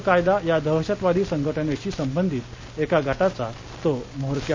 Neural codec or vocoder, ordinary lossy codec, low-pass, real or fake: none; MP3, 48 kbps; 7.2 kHz; real